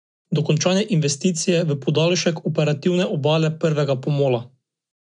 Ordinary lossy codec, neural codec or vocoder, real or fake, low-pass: none; none; real; 9.9 kHz